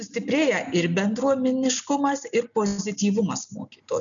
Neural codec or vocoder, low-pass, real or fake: none; 7.2 kHz; real